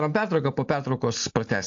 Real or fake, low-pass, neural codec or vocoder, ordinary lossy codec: real; 7.2 kHz; none; AAC, 64 kbps